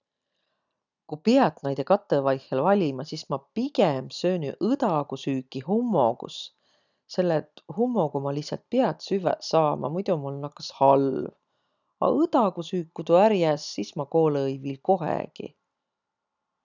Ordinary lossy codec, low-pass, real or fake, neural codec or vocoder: none; 7.2 kHz; real; none